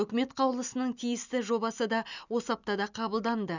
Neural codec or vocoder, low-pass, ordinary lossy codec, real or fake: none; 7.2 kHz; none; real